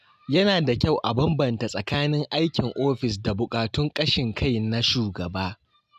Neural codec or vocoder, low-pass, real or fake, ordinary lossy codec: none; 14.4 kHz; real; none